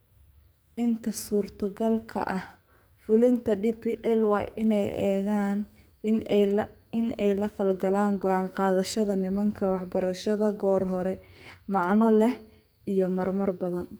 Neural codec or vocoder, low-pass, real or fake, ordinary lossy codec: codec, 44.1 kHz, 2.6 kbps, SNAC; none; fake; none